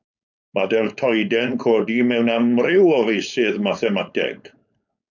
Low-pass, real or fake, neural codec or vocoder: 7.2 kHz; fake; codec, 16 kHz, 4.8 kbps, FACodec